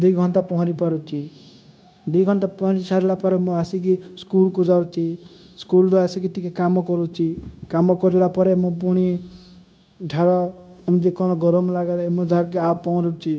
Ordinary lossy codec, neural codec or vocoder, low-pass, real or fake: none; codec, 16 kHz, 0.9 kbps, LongCat-Audio-Codec; none; fake